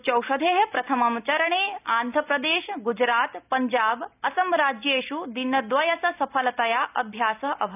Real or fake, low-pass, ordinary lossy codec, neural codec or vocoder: real; 3.6 kHz; none; none